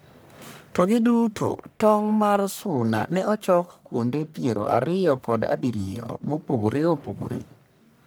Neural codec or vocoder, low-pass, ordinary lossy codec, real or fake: codec, 44.1 kHz, 1.7 kbps, Pupu-Codec; none; none; fake